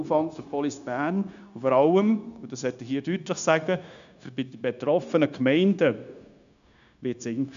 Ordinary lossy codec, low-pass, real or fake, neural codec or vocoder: none; 7.2 kHz; fake; codec, 16 kHz, 0.9 kbps, LongCat-Audio-Codec